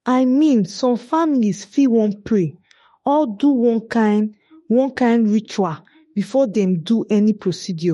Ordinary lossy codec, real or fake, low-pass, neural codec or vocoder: MP3, 48 kbps; fake; 19.8 kHz; autoencoder, 48 kHz, 32 numbers a frame, DAC-VAE, trained on Japanese speech